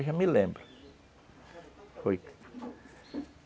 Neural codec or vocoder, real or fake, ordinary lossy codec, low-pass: none; real; none; none